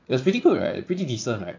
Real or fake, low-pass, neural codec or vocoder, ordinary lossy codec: fake; 7.2 kHz; vocoder, 44.1 kHz, 80 mel bands, Vocos; MP3, 48 kbps